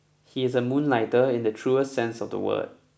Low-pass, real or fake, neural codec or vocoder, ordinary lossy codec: none; real; none; none